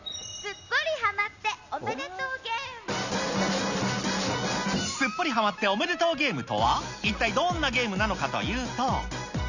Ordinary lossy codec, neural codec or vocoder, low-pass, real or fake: none; none; 7.2 kHz; real